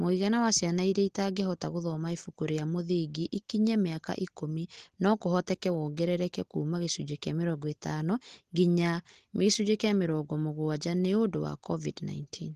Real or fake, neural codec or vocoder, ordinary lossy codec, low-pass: real; none; Opus, 16 kbps; 14.4 kHz